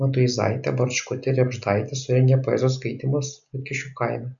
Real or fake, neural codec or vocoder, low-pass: real; none; 7.2 kHz